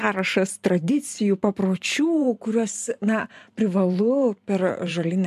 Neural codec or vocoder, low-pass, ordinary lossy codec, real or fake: vocoder, 44.1 kHz, 128 mel bands every 512 samples, BigVGAN v2; 14.4 kHz; MP3, 96 kbps; fake